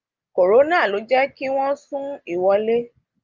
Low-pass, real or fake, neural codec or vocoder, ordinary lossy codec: 7.2 kHz; real; none; Opus, 32 kbps